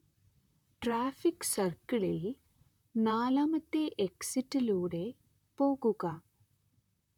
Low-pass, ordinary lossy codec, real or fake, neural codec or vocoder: 19.8 kHz; none; fake; vocoder, 44.1 kHz, 128 mel bands, Pupu-Vocoder